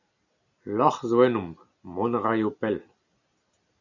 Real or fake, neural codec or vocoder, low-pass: real; none; 7.2 kHz